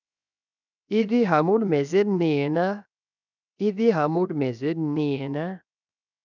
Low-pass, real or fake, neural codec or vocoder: 7.2 kHz; fake; codec, 16 kHz, 0.7 kbps, FocalCodec